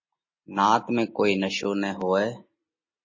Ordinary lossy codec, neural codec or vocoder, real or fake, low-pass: MP3, 32 kbps; none; real; 7.2 kHz